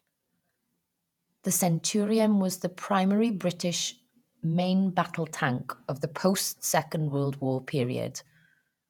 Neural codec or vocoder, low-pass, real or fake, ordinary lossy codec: vocoder, 48 kHz, 128 mel bands, Vocos; 19.8 kHz; fake; none